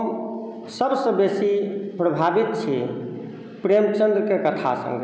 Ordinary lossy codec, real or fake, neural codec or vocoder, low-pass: none; real; none; none